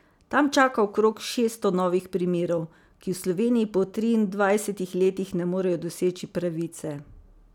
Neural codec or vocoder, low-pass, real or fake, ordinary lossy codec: none; 19.8 kHz; real; none